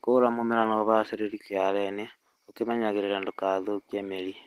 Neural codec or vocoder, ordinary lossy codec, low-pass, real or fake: none; Opus, 16 kbps; 14.4 kHz; real